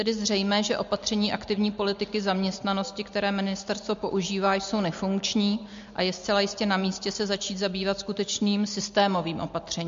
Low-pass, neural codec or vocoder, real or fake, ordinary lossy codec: 7.2 kHz; none; real; MP3, 48 kbps